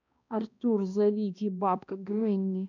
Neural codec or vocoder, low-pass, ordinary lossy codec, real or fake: codec, 16 kHz, 1 kbps, X-Codec, HuBERT features, trained on balanced general audio; 7.2 kHz; none; fake